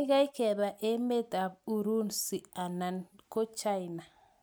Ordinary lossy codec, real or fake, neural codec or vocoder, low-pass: none; real; none; none